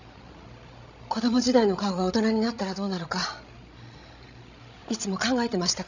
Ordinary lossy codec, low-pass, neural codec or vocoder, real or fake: none; 7.2 kHz; codec, 16 kHz, 16 kbps, FreqCodec, larger model; fake